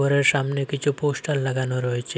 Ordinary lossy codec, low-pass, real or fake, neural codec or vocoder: none; none; real; none